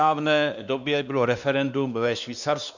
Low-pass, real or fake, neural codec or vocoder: 7.2 kHz; fake; codec, 16 kHz, 2 kbps, X-Codec, WavLM features, trained on Multilingual LibriSpeech